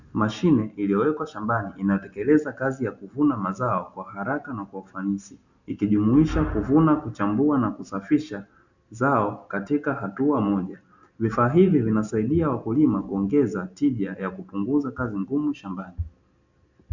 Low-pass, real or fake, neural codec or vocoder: 7.2 kHz; real; none